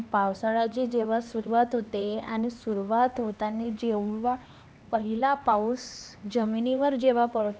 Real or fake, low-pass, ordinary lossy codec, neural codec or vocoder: fake; none; none; codec, 16 kHz, 2 kbps, X-Codec, HuBERT features, trained on LibriSpeech